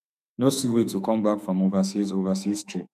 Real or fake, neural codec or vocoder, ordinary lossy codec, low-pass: fake; autoencoder, 48 kHz, 32 numbers a frame, DAC-VAE, trained on Japanese speech; none; 14.4 kHz